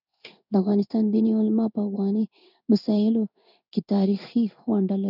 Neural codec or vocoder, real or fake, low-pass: codec, 16 kHz in and 24 kHz out, 1 kbps, XY-Tokenizer; fake; 5.4 kHz